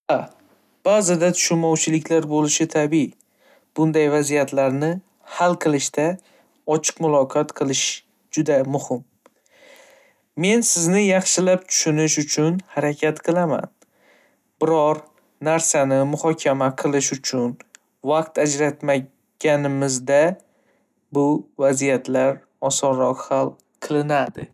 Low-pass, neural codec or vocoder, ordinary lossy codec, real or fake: 14.4 kHz; none; none; real